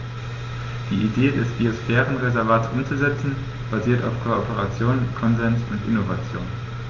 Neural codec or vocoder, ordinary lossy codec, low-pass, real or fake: none; Opus, 32 kbps; 7.2 kHz; real